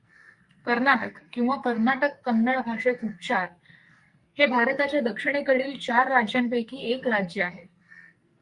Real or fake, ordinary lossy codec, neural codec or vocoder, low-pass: fake; Opus, 64 kbps; codec, 44.1 kHz, 2.6 kbps, SNAC; 10.8 kHz